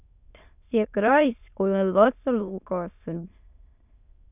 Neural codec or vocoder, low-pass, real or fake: autoencoder, 22.05 kHz, a latent of 192 numbers a frame, VITS, trained on many speakers; 3.6 kHz; fake